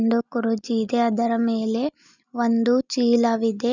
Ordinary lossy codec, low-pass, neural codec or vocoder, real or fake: none; 7.2 kHz; none; real